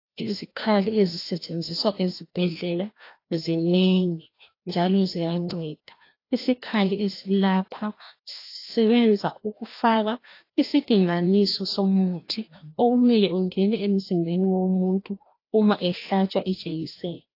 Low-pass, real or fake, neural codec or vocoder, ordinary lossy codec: 5.4 kHz; fake; codec, 16 kHz, 1 kbps, FreqCodec, larger model; AAC, 32 kbps